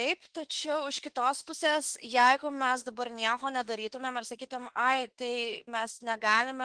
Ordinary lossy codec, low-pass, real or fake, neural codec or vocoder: Opus, 16 kbps; 9.9 kHz; fake; autoencoder, 48 kHz, 32 numbers a frame, DAC-VAE, trained on Japanese speech